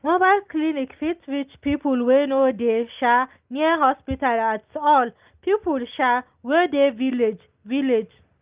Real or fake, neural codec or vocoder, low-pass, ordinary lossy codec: real; none; 3.6 kHz; Opus, 32 kbps